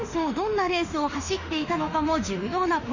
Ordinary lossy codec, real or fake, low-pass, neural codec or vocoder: none; fake; 7.2 kHz; autoencoder, 48 kHz, 32 numbers a frame, DAC-VAE, trained on Japanese speech